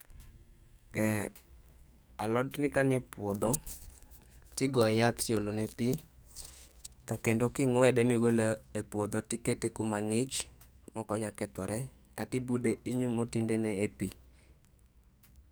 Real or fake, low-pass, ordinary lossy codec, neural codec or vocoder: fake; none; none; codec, 44.1 kHz, 2.6 kbps, SNAC